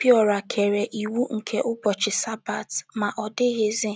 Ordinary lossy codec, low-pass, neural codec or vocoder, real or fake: none; none; none; real